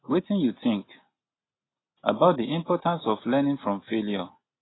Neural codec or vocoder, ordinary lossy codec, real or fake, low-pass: none; AAC, 16 kbps; real; 7.2 kHz